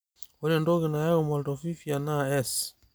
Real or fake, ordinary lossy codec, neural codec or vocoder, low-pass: real; none; none; none